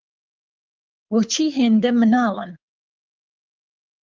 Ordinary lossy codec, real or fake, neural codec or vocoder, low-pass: Opus, 24 kbps; fake; codec, 24 kHz, 6 kbps, HILCodec; 7.2 kHz